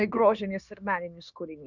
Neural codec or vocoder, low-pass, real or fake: codec, 16 kHz, 0.9 kbps, LongCat-Audio-Codec; 7.2 kHz; fake